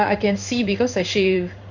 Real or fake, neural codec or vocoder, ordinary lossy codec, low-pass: fake; codec, 16 kHz in and 24 kHz out, 1 kbps, XY-Tokenizer; none; 7.2 kHz